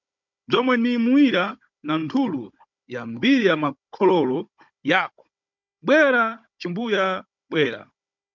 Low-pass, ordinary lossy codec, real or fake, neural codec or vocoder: 7.2 kHz; MP3, 64 kbps; fake; codec, 16 kHz, 16 kbps, FunCodec, trained on Chinese and English, 50 frames a second